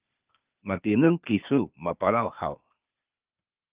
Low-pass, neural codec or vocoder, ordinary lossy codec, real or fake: 3.6 kHz; codec, 16 kHz, 0.8 kbps, ZipCodec; Opus, 24 kbps; fake